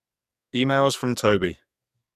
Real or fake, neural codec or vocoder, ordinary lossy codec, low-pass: fake; codec, 44.1 kHz, 2.6 kbps, SNAC; none; 14.4 kHz